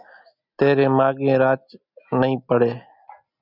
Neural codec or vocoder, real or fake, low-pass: none; real; 5.4 kHz